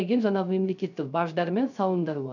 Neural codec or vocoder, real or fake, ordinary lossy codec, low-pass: codec, 16 kHz, 0.3 kbps, FocalCodec; fake; none; 7.2 kHz